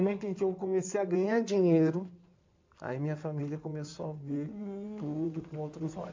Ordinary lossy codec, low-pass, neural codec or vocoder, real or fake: MP3, 64 kbps; 7.2 kHz; codec, 16 kHz in and 24 kHz out, 2.2 kbps, FireRedTTS-2 codec; fake